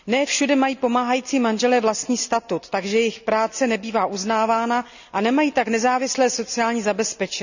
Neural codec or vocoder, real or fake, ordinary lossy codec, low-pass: none; real; none; 7.2 kHz